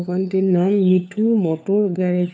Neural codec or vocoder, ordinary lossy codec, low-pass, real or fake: codec, 16 kHz, 4 kbps, FunCodec, trained on LibriTTS, 50 frames a second; none; none; fake